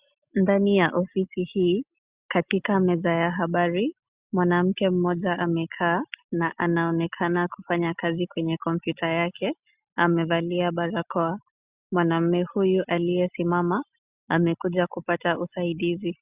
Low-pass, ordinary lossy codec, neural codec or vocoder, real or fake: 3.6 kHz; Opus, 64 kbps; none; real